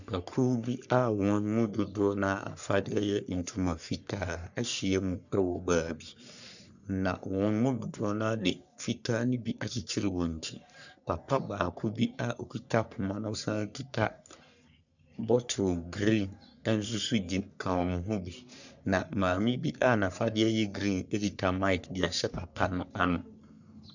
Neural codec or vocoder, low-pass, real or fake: codec, 44.1 kHz, 3.4 kbps, Pupu-Codec; 7.2 kHz; fake